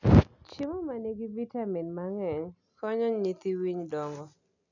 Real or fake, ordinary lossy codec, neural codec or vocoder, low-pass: real; none; none; 7.2 kHz